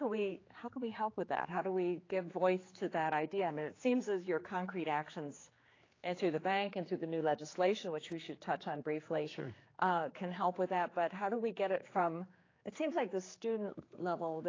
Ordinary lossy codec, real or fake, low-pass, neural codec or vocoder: AAC, 32 kbps; fake; 7.2 kHz; codec, 16 kHz, 4 kbps, X-Codec, HuBERT features, trained on general audio